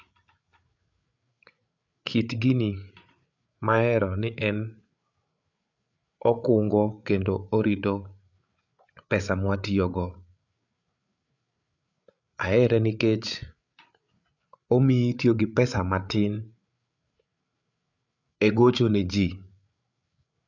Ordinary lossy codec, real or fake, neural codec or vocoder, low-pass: none; fake; codec, 16 kHz, 8 kbps, FreqCodec, larger model; 7.2 kHz